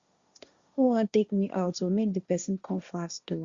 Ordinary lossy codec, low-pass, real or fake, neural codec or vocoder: Opus, 64 kbps; 7.2 kHz; fake; codec, 16 kHz, 1.1 kbps, Voila-Tokenizer